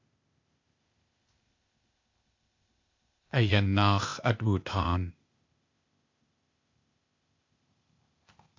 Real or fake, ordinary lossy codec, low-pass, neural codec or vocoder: fake; MP3, 48 kbps; 7.2 kHz; codec, 16 kHz, 0.8 kbps, ZipCodec